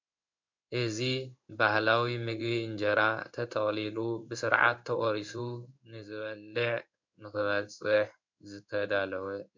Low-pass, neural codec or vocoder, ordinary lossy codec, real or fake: 7.2 kHz; codec, 16 kHz in and 24 kHz out, 1 kbps, XY-Tokenizer; MP3, 64 kbps; fake